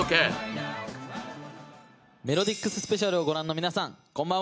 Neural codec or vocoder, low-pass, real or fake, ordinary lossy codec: none; none; real; none